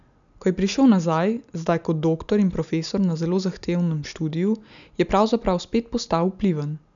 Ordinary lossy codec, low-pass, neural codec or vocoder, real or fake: MP3, 96 kbps; 7.2 kHz; none; real